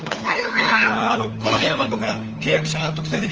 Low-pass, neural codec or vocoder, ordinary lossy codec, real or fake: 7.2 kHz; codec, 16 kHz, 4 kbps, FunCodec, trained on LibriTTS, 50 frames a second; Opus, 24 kbps; fake